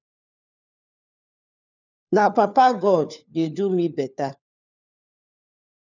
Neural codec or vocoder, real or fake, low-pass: codec, 16 kHz, 4 kbps, FunCodec, trained on LibriTTS, 50 frames a second; fake; 7.2 kHz